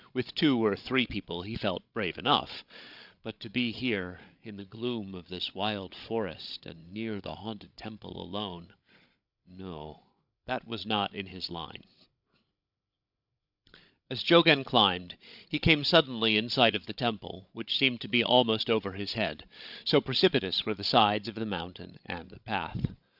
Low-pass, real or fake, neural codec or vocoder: 5.4 kHz; fake; codec, 16 kHz, 16 kbps, FreqCodec, larger model